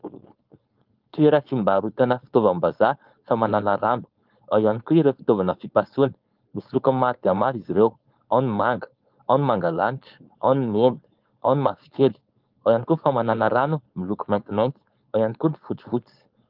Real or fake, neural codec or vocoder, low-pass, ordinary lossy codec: fake; codec, 16 kHz, 4.8 kbps, FACodec; 5.4 kHz; Opus, 32 kbps